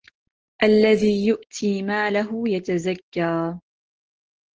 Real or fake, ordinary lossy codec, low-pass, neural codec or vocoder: real; Opus, 16 kbps; 7.2 kHz; none